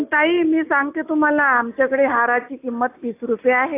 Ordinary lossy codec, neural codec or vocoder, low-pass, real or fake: AAC, 24 kbps; none; 3.6 kHz; real